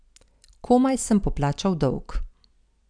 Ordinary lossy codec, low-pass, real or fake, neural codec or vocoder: none; 9.9 kHz; real; none